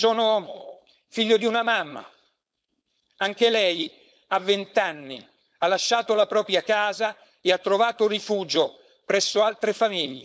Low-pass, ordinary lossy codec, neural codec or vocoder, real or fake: none; none; codec, 16 kHz, 4.8 kbps, FACodec; fake